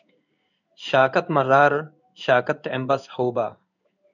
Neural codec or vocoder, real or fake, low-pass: codec, 16 kHz in and 24 kHz out, 1 kbps, XY-Tokenizer; fake; 7.2 kHz